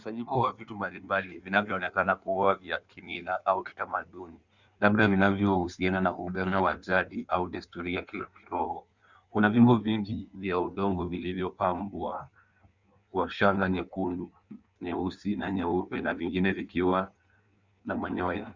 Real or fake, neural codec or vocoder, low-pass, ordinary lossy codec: fake; codec, 16 kHz in and 24 kHz out, 1.1 kbps, FireRedTTS-2 codec; 7.2 kHz; Opus, 64 kbps